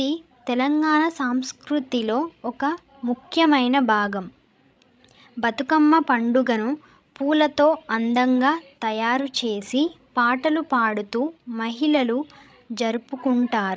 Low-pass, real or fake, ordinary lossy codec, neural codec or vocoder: none; fake; none; codec, 16 kHz, 16 kbps, FreqCodec, larger model